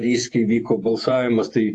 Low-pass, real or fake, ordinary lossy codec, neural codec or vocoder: 10.8 kHz; fake; AAC, 48 kbps; vocoder, 48 kHz, 128 mel bands, Vocos